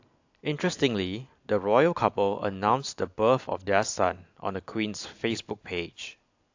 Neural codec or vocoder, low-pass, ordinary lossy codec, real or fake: none; 7.2 kHz; AAC, 48 kbps; real